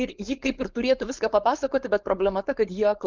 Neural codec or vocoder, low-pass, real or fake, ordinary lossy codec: codec, 16 kHz, 4.8 kbps, FACodec; 7.2 kHz; fake; Opus, 24 kbps